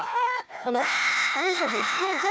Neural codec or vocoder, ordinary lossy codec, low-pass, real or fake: codec, 16 kHz, 1 kbps, FunCodec, trained on Chinese and English, 50 frames a second; none; none; fake